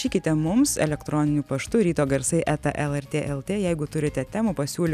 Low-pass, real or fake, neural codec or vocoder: 14.4 kHz; real; none